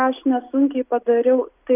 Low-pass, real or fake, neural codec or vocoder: 3.6 kHz; real; none